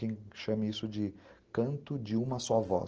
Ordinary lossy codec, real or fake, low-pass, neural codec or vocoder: Opus, 16 kbps; real; 7.2 kHz; none